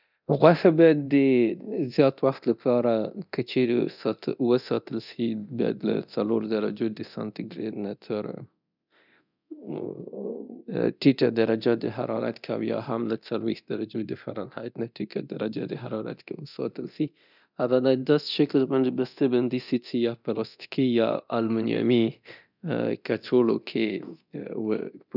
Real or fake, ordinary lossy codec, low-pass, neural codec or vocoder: fake; none; 5.4 kHz; codec, 24 kHz, 0.9 kbps, DualCodec